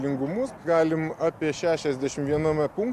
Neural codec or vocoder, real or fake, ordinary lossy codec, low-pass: none; real; AAC, 96 kbps; 14.4 kHz